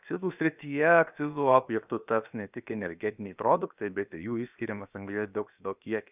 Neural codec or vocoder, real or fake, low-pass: codec, 16 kHz, about 1 kbps, DyCAST, with the encoder's durations; fake; 3.6 kHz